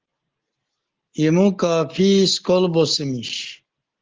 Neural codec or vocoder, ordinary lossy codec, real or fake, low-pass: none; Opus, 16 kbps; real; 7.2 kHz